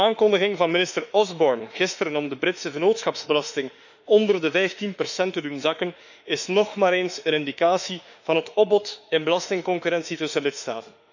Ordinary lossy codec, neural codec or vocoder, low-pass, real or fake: none; autoencoder, 48 kHz, 32 numbers a frame, DAC-VAE, trained on Japanese speech; 7.2 kHz; fake